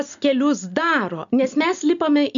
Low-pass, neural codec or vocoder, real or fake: 7.2 kHz; none; real